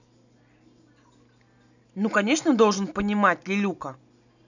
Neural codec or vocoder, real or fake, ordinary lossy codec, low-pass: none; real; none; 7.2 kHz